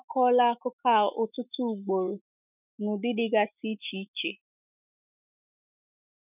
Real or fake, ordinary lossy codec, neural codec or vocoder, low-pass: fake; none; autoencoder, 48 kHz, 128 numbers a frame, DAC-VAE, trained on Japanese speech; 3.6 kHz